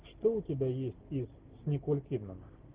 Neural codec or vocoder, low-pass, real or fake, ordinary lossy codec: none; 3.6 kHz; real; Opus, 16 kbps